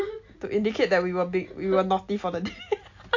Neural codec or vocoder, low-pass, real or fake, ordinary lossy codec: none; 7.2 kHz; real; AAC, 48 kbps